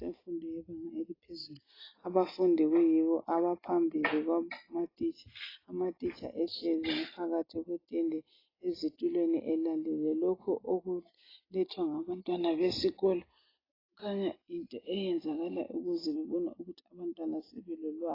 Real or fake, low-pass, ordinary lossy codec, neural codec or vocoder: real; 5.4 kHz; AAC, 24 kbps; none